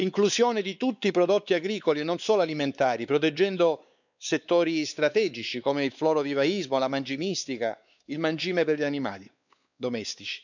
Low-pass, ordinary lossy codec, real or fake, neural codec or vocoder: 7.2 kHz; none; fake; codec, 16 kHz, 4 kbps, X-Codec, WavLM features, trained on Multilingual LibriSpeech